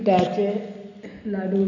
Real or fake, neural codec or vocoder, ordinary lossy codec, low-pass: real; none; none; 7.2 kHz